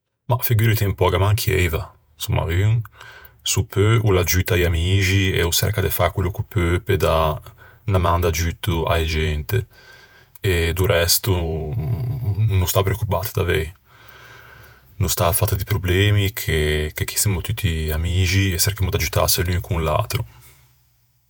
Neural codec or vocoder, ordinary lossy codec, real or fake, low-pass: vocoder, 48 kHz, 128 mel bands, Vocos; none; fake; none